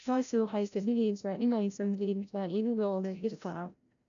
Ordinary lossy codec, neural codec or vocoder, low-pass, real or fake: none; codec, 16 kHz, 0.5 kbps, FreqCodec, larger model; 7.2 kHz; fake